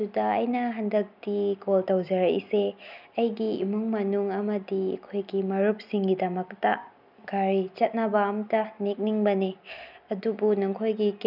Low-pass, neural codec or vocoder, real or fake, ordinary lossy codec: 5.4 kHz; none; real; none